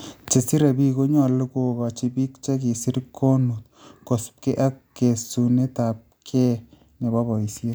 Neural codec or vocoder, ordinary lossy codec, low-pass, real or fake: none; none; none; real